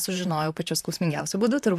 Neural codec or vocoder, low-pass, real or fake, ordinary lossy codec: vocoder, 44.1 kHz, 128 mel bands, Pupu-Vocoder; 14.4 kHz; fake; AAC, 96 kbps